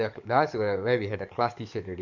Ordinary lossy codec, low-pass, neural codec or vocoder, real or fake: none; 7.2 kHz; codec, 44.1 kHz, 7.8 kbps, DAC; fake